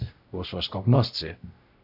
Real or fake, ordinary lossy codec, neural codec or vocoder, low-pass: fake; AAC, 48 kbps; codec, 16 kHz, 0.5 kbps, X-Codec, WavLM features, trained on Multilingual LibriSpeech; 5.4 kHz